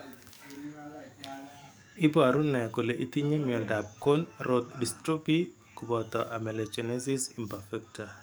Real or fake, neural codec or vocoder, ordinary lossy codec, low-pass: fake; codec, 44.1 kHz, 7.8 kbps, DAC; none; none